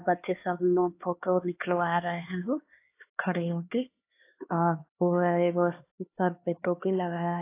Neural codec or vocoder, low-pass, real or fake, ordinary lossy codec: codec, 16 kHz, 2 kbps, X-Codec, HuBERT features, trained on LibriSpeech; 3.6 kHz; fake; AAC, 24 kbps